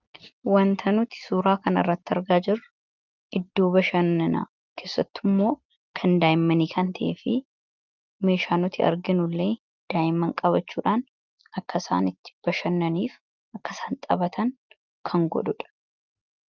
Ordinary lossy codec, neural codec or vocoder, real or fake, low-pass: Opus, 32 kbps; none; real; 7.2 kHz